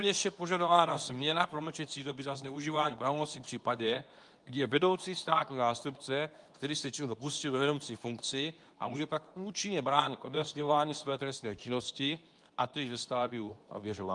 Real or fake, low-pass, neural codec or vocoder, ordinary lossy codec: fake; 10.8 kHz; codec, 24 kHz, 0.9 kbps, WavTokenizer, medium speech release version 2; Opus, 32 kbps